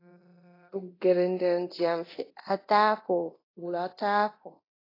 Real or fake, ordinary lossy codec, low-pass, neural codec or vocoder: fake; AAC, 24 kbps; 5.4 kHz; codec, 24 kHz, 0.9 kbps, DualCodec